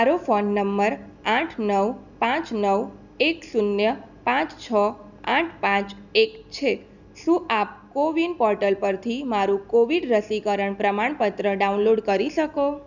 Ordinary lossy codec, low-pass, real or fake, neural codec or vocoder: none; 7.2 kHz; real; none